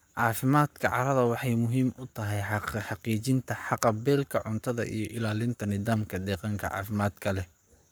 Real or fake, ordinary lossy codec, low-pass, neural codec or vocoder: fake; none; none; codec, 44.1 kHz, 7.8 kbps, Pupu-Codec